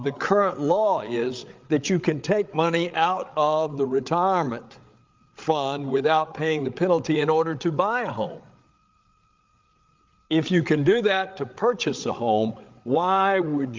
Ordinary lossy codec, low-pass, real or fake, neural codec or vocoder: Opus, 32 kbps; 7.2 kHz; fake; codec, 16 kHz, 8 kbps, FreqCodec, larger model